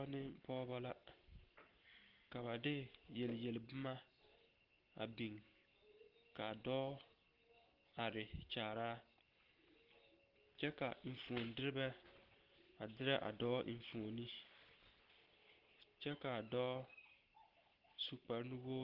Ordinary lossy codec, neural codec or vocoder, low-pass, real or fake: Opus, 16 kbps; none; 5.4 kHz; real